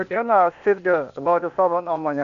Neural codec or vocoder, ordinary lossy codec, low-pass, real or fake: codec, 16 kHz, 0.8 kbps, ZipCodec; MP3, 96 kbps; 7.2 kHz; fake